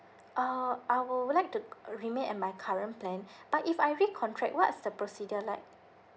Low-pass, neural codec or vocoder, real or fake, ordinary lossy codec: none; none; real; none